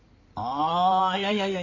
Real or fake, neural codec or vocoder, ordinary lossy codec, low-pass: fake; codec, 16 kHz in and 24 kHz out, 2.2 kbps, FireRedTTS-2 codec; none; 7.2 kHz